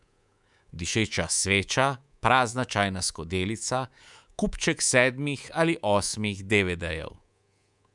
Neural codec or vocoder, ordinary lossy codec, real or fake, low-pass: codec, 24 kHz, 3.1 kbps, DualCodec; none; fake; 10.8 kHz